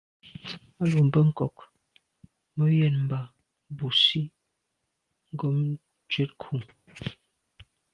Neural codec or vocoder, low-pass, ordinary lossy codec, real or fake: none; 10.8 kHz; Opus, 24 kbps; real